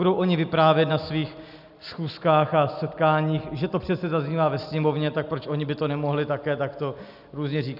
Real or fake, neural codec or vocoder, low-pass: real; none; 5.4 kHz